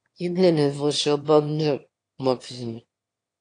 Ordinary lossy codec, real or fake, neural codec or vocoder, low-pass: AAC, 48 kbps; fake; autoencoder, 22.05 kHz, a latent of 192 numbers a frame, VITS, trained on one speaker; 9.9 kHz